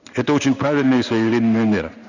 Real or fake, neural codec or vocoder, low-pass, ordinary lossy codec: fake; codec, 16 kHz in and 24 kHz out, 1 kbps, XY-Tokenizer; 7.2 kHz; none